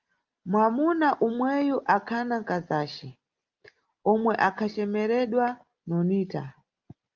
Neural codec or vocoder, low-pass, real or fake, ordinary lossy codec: none; 7.2 kHz; real; Opus, 24 kbps